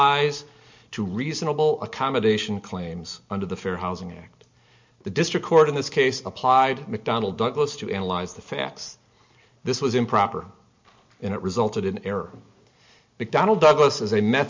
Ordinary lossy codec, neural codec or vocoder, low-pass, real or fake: MP3, 64 kbps; none; 7.2 kHz; real